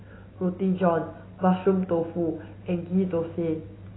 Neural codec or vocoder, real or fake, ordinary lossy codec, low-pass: none; real; AAC, 16 kbps; 7.2 kHz